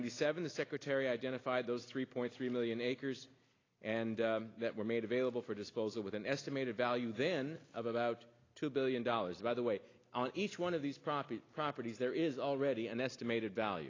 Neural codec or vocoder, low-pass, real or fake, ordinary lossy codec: none; 7.2 kHz; real; AAC, 32 kbps